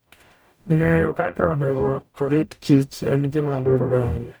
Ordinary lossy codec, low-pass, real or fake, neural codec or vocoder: none; none; fake; codec, 44.1 kHz, 0.9 kbps, DAC